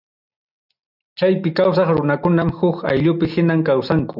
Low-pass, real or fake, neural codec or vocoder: 5.4 kHz; real; none